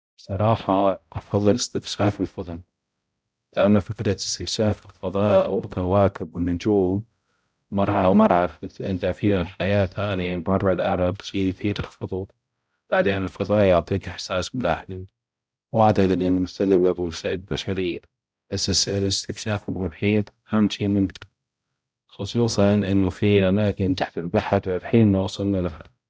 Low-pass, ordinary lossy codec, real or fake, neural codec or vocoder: none; none; fake; codec, 16 kHz, 0.5 kbps, X-Codec, HuBERT features, trained on balanced general audio